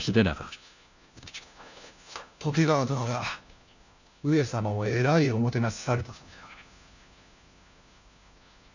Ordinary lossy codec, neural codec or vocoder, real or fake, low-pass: none; codec, 16 kHz, 1 kbps, FunCodec, trained on LibriTTS, 50 frames a second; fake; 7.2 kHz